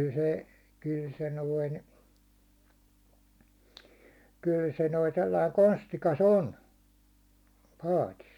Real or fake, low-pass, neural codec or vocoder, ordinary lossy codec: real; 19.8 kHz; none; none